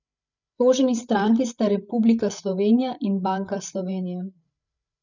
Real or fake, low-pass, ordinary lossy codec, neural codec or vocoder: fake; 7.2 kHz; none; codec, 16 kHz, 8 kbps, FreqCodec, larger model